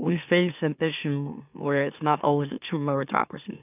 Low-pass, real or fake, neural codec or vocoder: 3.6 kHz; fake; autoencoder, 44.1 kHz, a latent of 192 numbers a frame, MeloTTS